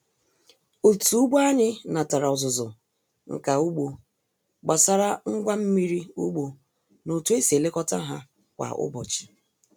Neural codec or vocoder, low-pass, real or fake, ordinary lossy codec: none; none; real; none